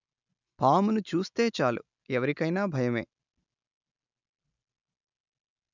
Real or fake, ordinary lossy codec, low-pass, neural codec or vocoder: real; none; 7.2 kHz; none